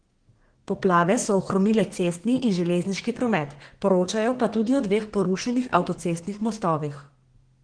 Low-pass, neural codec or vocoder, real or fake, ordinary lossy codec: 9.9 kHz; codec, 32 kHz, 1.9 kbps, SNAC; fake; Opus, 16 kbps